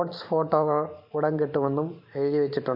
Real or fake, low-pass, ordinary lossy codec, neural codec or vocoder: fake; 5.4 kHz; MP3, 32 kbps; vocoder, 44.1 kHz, 128 mel bands every 512 samples, BigVGAN v2